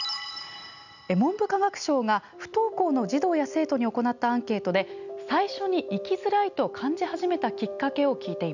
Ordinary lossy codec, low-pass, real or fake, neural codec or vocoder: none; 7.2 kHz; real; none